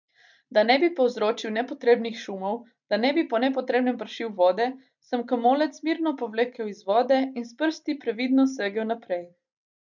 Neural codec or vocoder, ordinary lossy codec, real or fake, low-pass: none; none; real; 7.2 kHz